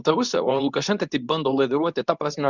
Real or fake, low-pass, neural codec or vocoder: fake; 7.2 kHz; codec, 24 kHz, 0.9 kbps, WavTokenizer, medium speech release version 2